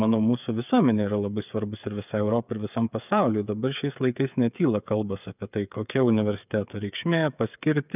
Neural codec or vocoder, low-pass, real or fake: codec, 16 kHz, 8 kbps, FreqCodec, smaller model; 3.6 kHz; fake